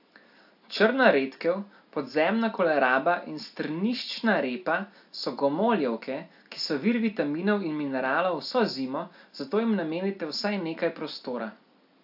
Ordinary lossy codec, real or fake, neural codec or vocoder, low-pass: AAC, 48 kbps; real; none; 5.4 kHz